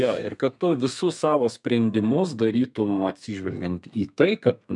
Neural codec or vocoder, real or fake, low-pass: codec, 32 kHz, 1.9 kbps, SNAC; fake; 10.8 kHz